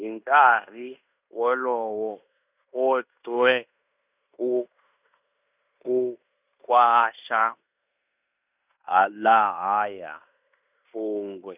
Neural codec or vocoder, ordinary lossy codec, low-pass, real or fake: codec, 16 kHz in and 24 kHz out, 0.9 kbps, LongCat-Audio-Codec, fine tuned four codebook decoder; none; 3.6 kHz; fake